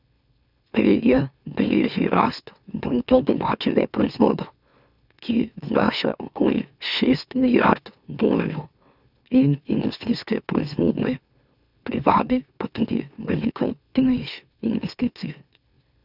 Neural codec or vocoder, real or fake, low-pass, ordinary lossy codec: autoencoder, 44.1 kHz, a latent of 192 numbers a frame, MeloTTS; fake; 5.4 kHz; none